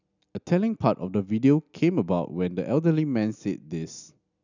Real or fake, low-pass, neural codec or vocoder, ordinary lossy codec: real; 7.2 kHz; none; none